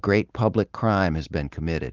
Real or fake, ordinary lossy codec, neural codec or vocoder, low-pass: real; Opus, 32 kbps; none; 7.2 kHz